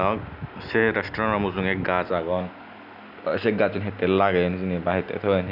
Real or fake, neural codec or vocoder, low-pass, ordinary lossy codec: real; none; 5.4 kHz; none